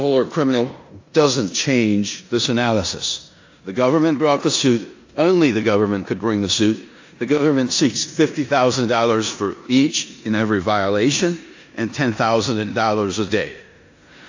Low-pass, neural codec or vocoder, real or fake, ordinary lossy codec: 7.2 kHz; codec, 16 kHz in and 24 kHz out, 0.9 kbps, LongCat-Audio-Codec, four codebook decoder; fake; AAC, 48 kbps